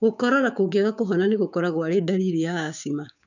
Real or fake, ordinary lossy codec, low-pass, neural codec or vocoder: fake; none; 7.2 kHz; codec, 16 kHz, 6 kbps, DAC